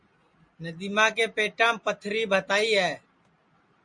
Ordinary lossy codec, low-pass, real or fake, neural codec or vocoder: MP3, 48 kbps; 9.9 kHz; real; none